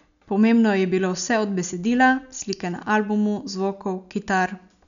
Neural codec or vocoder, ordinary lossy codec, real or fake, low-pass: none; none; real; 7.2 kHz